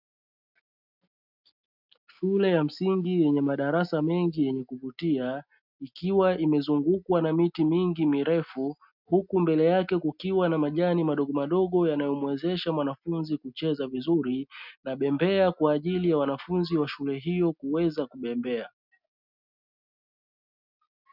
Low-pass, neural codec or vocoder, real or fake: 5.4 kHz; none; real